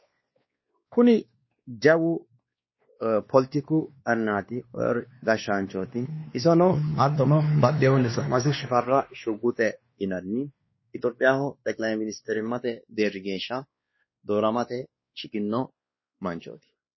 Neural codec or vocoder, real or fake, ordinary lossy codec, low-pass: codec, 16 kHz, 2 kbps, X-Codec, WavLM features, trained on Multilingual LibriSpeech; fake; MP3, 24 kbps; 7.2 kHz